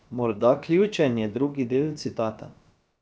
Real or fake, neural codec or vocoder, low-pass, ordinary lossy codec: fake; codec, 16 kHz, about 1 kbps, DyCAST, with the encoder's durations; none; none